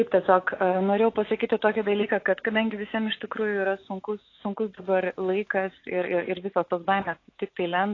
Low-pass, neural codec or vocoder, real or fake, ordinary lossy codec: 7.2 kHz; autoencoder, 48 kHz, 128 numbers a frame, DAC-VAE, trained on Japanese speech; fake; AAC, 32 kbps